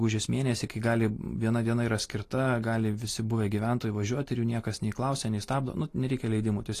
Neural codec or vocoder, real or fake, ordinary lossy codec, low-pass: none; real; AAC, 48 kbps; 14.4 kHz